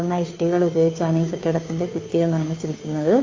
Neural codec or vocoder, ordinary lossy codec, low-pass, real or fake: codec, 16 kHz, 2 kbps, FunCodec, trained on Chinese and English, 25 frames a second; AAC, 32 kbps; 7.2 kHz; fake